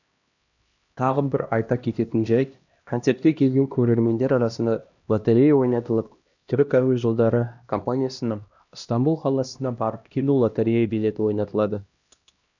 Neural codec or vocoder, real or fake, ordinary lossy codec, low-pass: codec, 16 kHz, 1 kbps, X-Codec, HuBERT features, trained on LibriSpeech; fake; none; 7.2 kHz